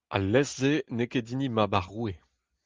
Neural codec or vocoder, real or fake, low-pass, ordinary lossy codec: none; real; 7.2 kHz; Opus, 32 kbps